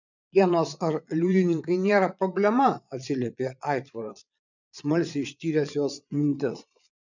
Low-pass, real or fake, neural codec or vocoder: 7.2 kHz; fake; vocoder, 22.05 kHz, 80 mel bands, Vocos